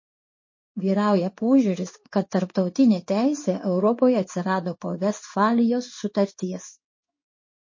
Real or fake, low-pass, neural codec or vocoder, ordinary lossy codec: fake; 7.2 kHz; codec, 16 kHz in and 24 kHz out, 1 kbps, XY-Tokenizer; MP3, 32 kbps